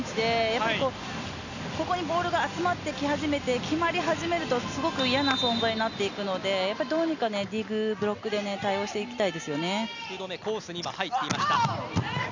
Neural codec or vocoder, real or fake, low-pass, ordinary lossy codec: none; real; 7.2 kHz; none